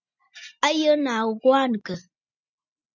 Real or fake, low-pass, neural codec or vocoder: real; 7.2 kHz; none